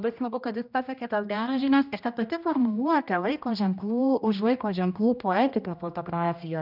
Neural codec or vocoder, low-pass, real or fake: codec, 16 kHz, 1 kbps, X-Codec, HuBERT features, trained on general audio; 5.4 kHz; fake